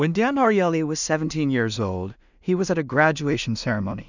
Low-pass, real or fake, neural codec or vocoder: 7.2 kHz; fake; codec, 16 kHz in and 24 kHz out, 0.4 kbps, LongCat-Audio-Codec, two codebook decoder